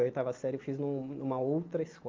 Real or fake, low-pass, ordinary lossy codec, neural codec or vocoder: real; 7.2 kHz; Opus, 32 kbps; none